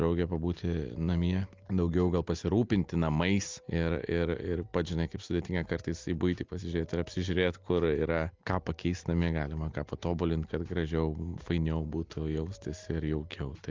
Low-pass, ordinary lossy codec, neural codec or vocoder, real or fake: 7.2 kHz; Opus, 24 kbps; none; real